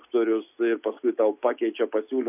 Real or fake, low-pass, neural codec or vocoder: real; 3.6 kHz; none